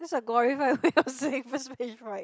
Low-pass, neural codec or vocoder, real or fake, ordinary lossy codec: none; none; real; none